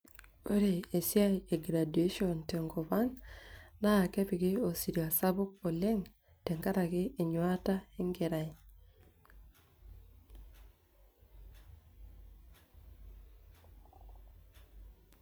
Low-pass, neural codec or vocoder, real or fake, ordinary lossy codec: none; vocoder, 44.1 kHz, 128 mel bands every 256 samples, BigVGAN v2; fake; none